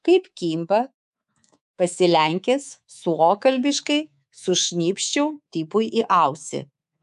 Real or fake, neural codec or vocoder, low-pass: fake; codec, 24 kHz, 3.1 kbps, DualCodec; 10.8 kHz